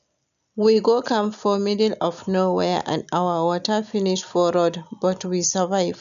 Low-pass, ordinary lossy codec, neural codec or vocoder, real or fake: 7.2 kHz; none; none; real